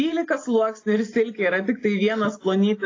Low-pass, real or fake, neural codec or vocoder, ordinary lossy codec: 7.2 kHz; real; none; AAC, 32 kbps